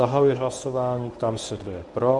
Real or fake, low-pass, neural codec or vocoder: fake; 10.8 kHz; codec, 24 kHz, 0.9 kbps, WavTokenizer, medium speech release version 1